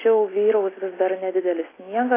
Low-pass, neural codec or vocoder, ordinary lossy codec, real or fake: 3.6 kHz; none; AAC, 16 kbps; real